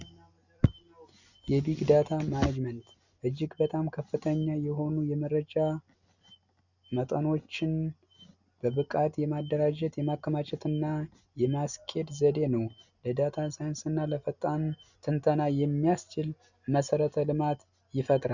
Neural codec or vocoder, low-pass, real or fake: none; 7.2 kHz; real